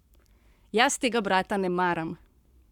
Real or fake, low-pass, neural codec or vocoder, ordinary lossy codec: fake; 19.8 kHz; codec, 44.1 kHz, 7.8 kbps, Pupu-Codec; none